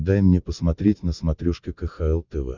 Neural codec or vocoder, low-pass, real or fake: none; 7.2 kHz; real